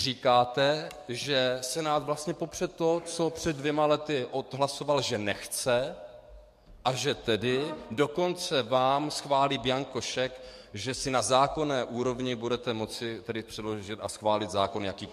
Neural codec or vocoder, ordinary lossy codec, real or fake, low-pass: codec, 44.1 kHz, 7.8 kbps, DAC; MP3, 64 kbps; fake; 14.4 kHz